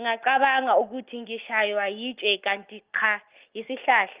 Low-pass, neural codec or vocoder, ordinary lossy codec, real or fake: 3.6 kHz; none; Opus, 32 kbps; real